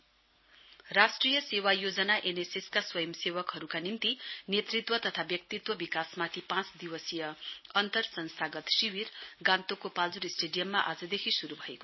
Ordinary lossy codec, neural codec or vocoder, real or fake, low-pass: MP3, 24 kbps; none; real; 7.2 kHz